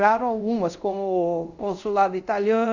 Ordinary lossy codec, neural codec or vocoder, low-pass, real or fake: none; codec, 24 kHz, 0.5 kbps, DualCodec; 7.2 kHz; fake